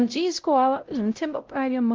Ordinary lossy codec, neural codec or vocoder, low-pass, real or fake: Opus, 24 kbps; codec, 16 kHz, 0.5 kbps, X-Codec, WavLM features, trained on Multilingual LibriSpeech; 7.2 kHz; fake